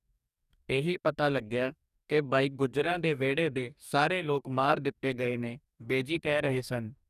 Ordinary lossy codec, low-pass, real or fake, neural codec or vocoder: none; 14.4 kHz; fake; codec, 44.1 kHz, 2.6 kbps, DAC